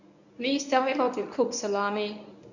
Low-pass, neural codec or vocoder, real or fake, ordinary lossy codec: 7.2 kHz; codec, 24 kHz, 0.9 kbps, WavTokenizer, medium speech release version 1; fake; none